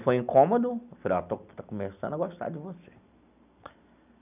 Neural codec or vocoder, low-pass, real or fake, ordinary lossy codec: none; 3.6 kHz; real; none